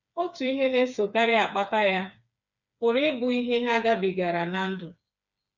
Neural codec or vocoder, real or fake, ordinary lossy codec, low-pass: codec, 16 kHz, 4 kbps, FreqCodec, smaller model; fake; none; 7.2 kHz